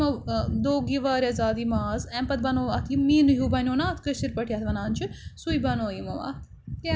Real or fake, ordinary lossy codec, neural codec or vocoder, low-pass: real; none; none; none